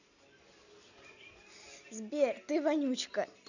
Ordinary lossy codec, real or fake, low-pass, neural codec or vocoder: none; real; 7.2 kHz; none